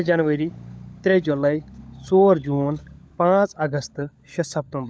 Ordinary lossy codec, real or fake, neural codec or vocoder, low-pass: none; fake; codec, 16 kHz, 16 kbps, FunCodec, trained on LibriTTS, 50 frames a second; none